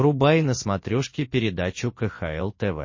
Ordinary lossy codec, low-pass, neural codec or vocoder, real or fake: MP3, 32 kbps; 7.2 kHz; none; real